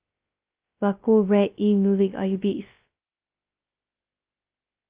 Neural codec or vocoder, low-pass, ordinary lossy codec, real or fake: codec, 16 kHz, 0.2 kbps, FocalCodec; 3.6 kHz; Opus, 24 kbps; fake